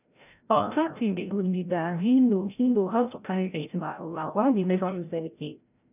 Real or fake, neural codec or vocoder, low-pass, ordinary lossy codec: fake; codec, 16 kHz, 0.5 kbps, FreqCodec, larger model; 3.6 kHz; none